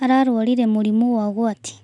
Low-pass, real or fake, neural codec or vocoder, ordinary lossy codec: 10.8 kHz; real; none; none